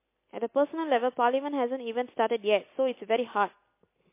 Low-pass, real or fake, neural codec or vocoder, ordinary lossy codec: 3.6 kHz; real; none; MP3, 24 kbps